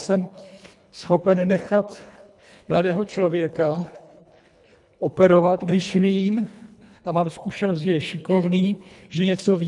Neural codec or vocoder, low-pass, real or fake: codec, 24 kHz, 1.5 kbps, HILCodec; 10.8 kHz; fake